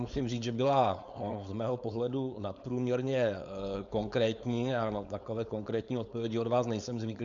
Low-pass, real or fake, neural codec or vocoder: 7.2 kHz; fake; codec, 16 kHz, 4.8 kbps, FACodec